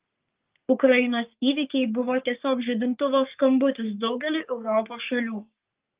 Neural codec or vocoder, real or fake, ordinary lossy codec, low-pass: codec, 44.1 kHz, 3.4 kbps, Pupu-Codec; fake; Opus, 32 kbps; 3.6 kHz